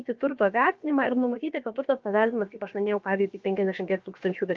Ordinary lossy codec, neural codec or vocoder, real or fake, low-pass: Opus, 24 kbps; codec, 16 kHz, about 1 kbps, DyCAST, with the encoder's durations; fake; 7.2 kHz